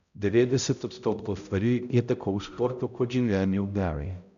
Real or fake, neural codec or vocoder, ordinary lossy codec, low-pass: fake; codec, 16 kHz, 0.5 kbps, X-Codec, HuBERT features, trained on balanced general audio; none; 7.2 kHz